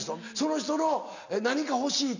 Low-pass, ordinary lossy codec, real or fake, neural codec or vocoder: 7.2 kHz; none; real; none